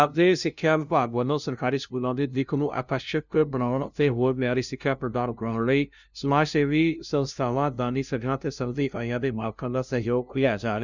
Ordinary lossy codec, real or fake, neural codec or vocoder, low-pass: none; fake; codec, 16 kHz, 0.5 kbps, FunCodec, trained on LibriTTS, 25 frames a second; 7.2 kHz